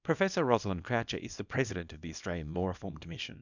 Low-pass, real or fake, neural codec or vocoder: 7.2 kHz; fake; codec, 24 kHz, 0.9 kbps, WavTokenizer, small release